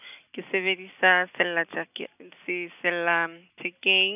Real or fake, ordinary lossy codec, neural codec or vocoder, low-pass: real; none; none; 3.6 kHz